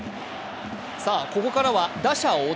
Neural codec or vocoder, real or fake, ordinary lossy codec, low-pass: none; real; none; none